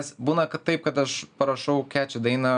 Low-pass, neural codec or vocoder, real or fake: 9.9 kHz; none; real